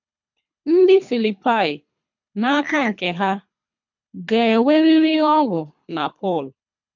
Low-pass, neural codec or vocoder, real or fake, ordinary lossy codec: 7.2 kHz; codec, 24 kHz, 3 kbps, HILCodec; fake; none